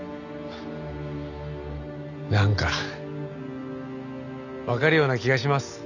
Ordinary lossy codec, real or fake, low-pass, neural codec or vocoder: none; real; 7.2 kHz; none